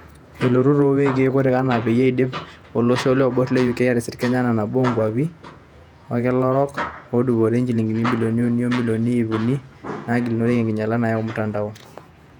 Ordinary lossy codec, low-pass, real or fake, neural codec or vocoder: none; 19.8 kHz; fake; vocoder, 48 kHz, 128 mel bands, Vocos